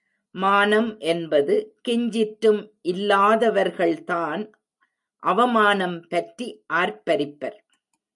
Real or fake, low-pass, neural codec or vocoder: real; 10.8 kHz; none